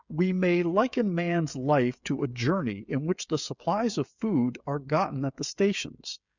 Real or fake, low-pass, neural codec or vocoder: fake; 7.2 kHz; codec, 16 kHz, 16 kbps, FreqCodec, smaller model